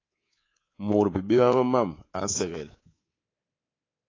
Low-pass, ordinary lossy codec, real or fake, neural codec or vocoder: 7.2 kHz; AAC, 32 kbps; fake; codec, 24 kHz, 3.1 kbps, DualCodec